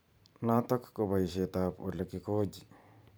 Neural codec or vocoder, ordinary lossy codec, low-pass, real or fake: vocoder, 44.1 kHz, 128 mel bands every 512 samples, BigVGAN v2; none; none; fake